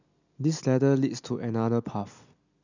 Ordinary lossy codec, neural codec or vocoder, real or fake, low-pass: none; none; real; 7.2 kHz